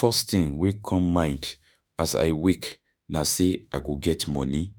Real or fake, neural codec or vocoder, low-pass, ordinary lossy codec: fake; autoencoder, 48 kHz, 32 numbers a frame, DAC-VAE, trained on Japanese speech; none; none